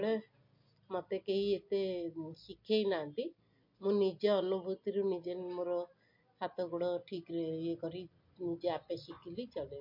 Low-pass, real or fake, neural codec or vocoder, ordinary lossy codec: 5.4 kHz; real; none; MP3, 32 kbps